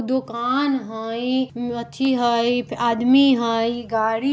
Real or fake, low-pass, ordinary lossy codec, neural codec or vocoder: real; none; none; none